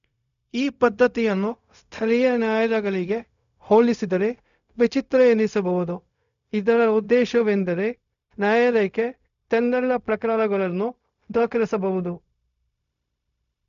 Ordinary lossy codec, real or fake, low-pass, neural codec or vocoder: none; fake; 7.2 kHz; codec, 16 kHz, 0.4 kbps, LongCat-Audio-Codec